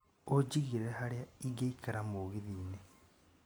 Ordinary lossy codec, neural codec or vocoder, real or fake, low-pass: none; none; real; none